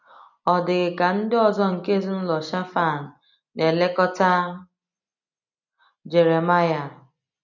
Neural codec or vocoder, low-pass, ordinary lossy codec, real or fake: none; 7.2 kHz; none; real